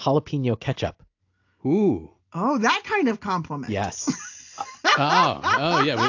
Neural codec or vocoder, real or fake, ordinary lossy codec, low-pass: none; real; AAC, 48 kbps; 7.2 kHz